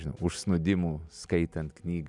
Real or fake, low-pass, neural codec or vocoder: real; 10.8 kHz; none